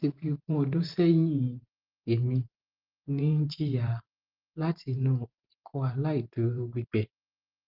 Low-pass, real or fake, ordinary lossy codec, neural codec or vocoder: 5.4 kHz; fake; Opus, 32 kbps; vocoder, 44.1 kHz, 128 mel bands every 512 samples, BigVGAN v2